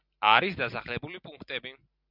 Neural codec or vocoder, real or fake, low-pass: none; real; 5.4 kHz